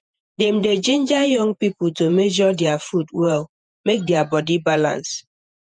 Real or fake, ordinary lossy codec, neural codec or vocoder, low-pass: fake; none; vocoder, 48 kHz, 128 mel bands, Vocos; 9.9 kHz